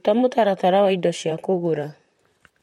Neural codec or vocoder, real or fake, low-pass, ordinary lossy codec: vocoder, 44.1 kHz, 128 mel bands, Pupu-Vocoder; fake; 19.8 kHz; MP3, 64 kbps